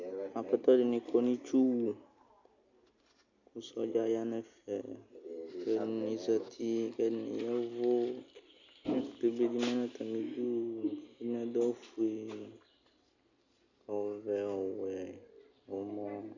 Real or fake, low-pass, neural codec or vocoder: real; 7.2 kHz; none